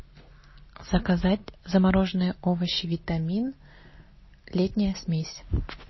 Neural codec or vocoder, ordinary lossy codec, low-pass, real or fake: none; MP3, 24 kbps; 7.2 kHz; real